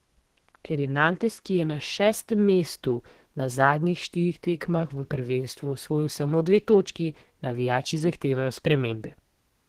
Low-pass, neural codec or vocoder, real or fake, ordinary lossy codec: 14.4 kHz; codec, 32 kHz, 1.9 kbps, SNAC; fake; Opus, 16 kbps